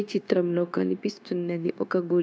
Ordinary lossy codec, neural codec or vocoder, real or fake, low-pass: none; codec, 16 kHz, 0.9 kbps, LongCat-Audio-Codec; fake; none